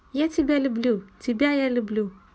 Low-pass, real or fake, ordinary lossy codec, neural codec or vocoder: none; real; none; none